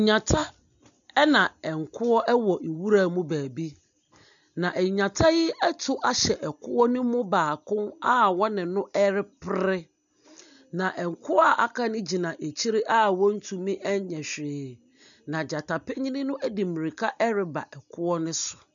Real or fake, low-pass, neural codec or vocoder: real; 7.2 kHz; none